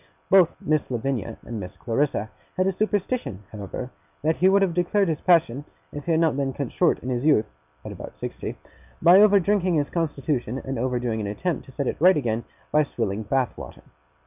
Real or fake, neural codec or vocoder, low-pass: real; none; 3.6 kHz